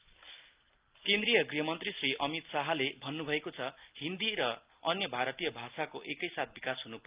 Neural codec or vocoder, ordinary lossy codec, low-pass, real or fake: none; Opus, 24 kbps; 3.6 kHz; real